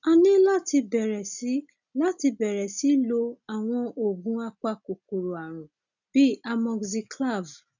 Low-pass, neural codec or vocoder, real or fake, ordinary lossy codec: 7.2 kHz; none; real; none